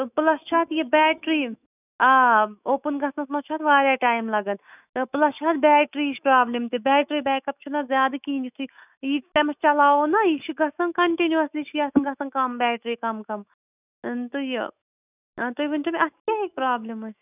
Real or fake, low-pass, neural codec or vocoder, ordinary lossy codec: fake; 3.6 kHz; autoencoder, 48 kHz, 128 numbers a frame, DAC-VAE, trained on Japanese speech; none